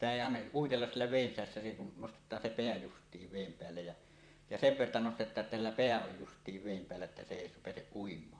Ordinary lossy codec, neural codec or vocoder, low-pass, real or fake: none; vocoder, 44.1 kHz, 128 mel bands, Pupu-Vocoder; 9.9 kHz; fake